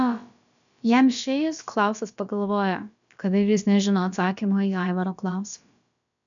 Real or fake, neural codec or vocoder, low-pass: fake; codec, 16 kHz, about 1 kbps, DyCAST, with the encoder's durations; 7.2 kHz